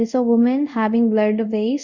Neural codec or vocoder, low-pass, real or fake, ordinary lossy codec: codec, 24 kHz, 0.5 kbps, DualCodec; 7.2 kHz; fake; Opus, 64 kbps